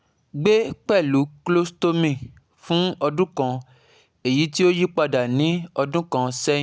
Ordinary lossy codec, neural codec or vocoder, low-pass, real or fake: none; none; none; real